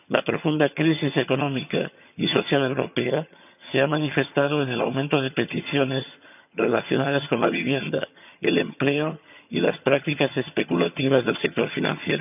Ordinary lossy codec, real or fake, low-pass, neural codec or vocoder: none; fake; 3.6 kHz; vocoder, 22.05 kHz, 80 mel bands, HiFi-GAN